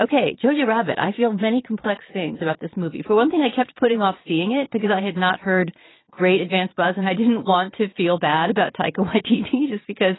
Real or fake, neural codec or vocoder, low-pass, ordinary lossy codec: fake; vocoder, 22.05 kHz, 80 mel bands, Vocos; 7.2 kHz; AAC, 16 kbps